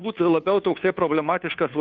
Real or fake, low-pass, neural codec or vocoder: fake; 7.2 kHz; codec, 16 kHz, 2 kbps, FunCodec, trained on Chinese and English, 25 frames a second